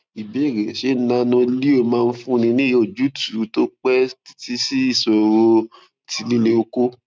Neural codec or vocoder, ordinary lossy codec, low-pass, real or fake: none; none; none; real